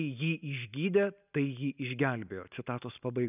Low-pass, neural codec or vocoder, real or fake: 3.6 kHz; none; real